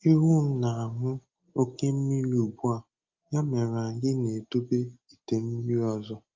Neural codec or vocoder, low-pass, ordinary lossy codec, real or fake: none; 7.2 kHz; Opus, 24 kbps; real